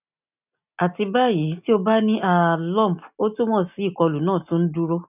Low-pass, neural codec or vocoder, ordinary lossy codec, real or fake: 3.6 kHz; none; none; real